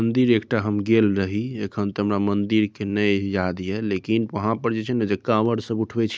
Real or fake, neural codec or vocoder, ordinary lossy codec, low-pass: real; none; none; none